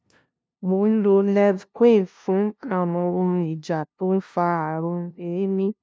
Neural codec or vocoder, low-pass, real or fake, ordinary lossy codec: codec, 16 kHz, 0.5 kbps, FunCodec, trained on LibriTTS, 25 frames a second; none; fake; none